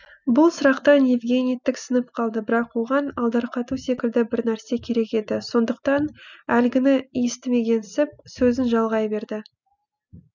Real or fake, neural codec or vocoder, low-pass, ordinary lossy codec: real; none; 7.2 kHz; none